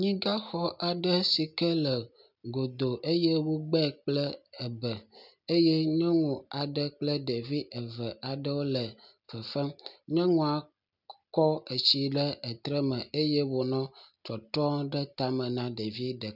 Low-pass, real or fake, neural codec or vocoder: 5.4 kHz; real; none